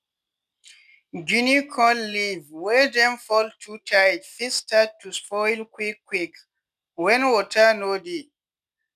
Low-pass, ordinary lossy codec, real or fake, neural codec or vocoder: 14.4 kHz; none; real; none